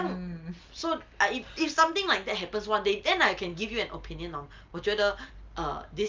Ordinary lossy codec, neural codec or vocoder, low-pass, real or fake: Opus, 24 kbps; none; 7.2 kHz; real